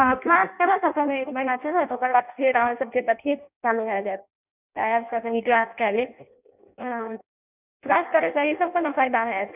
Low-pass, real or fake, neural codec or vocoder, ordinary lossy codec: 3.6 kHz; fake; codec, 16 kHz in and 24 kHz out, 0.6 kbps, FireRedTTS-2 codec; none